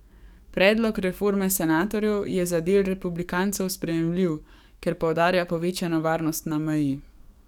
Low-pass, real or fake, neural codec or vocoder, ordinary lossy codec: 19.8 kHz; fake; codec, 44.1 kHz, 7.8 kbps, DAC; none